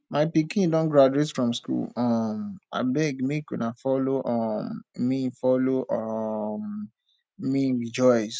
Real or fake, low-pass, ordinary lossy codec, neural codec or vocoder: real; none; none; none